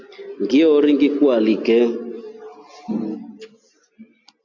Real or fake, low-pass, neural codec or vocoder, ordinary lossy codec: real; 7.2 kHz; none; AAC, 48 kbps